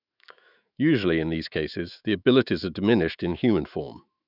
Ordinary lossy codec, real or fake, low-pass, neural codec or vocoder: none; fake; 5.4 kHz; autoencoder, 48 kHz, 128 numbers a frame, DAC-VAE, trained on Japanese speech